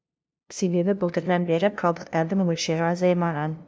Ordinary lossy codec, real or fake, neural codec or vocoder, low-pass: none; fake; codec, 16 kHz, 0.5 kbps, FunCodec, trained on LibriTTS, 25 frames a second; none